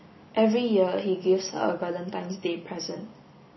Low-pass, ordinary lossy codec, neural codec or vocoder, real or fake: 7.2 kHz; MP3, 24 kbps; none; real